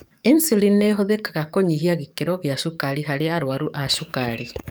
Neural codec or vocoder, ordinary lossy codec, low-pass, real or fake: codec, 44.1 kHz, 7.8 kbps, DAC; none; none; fake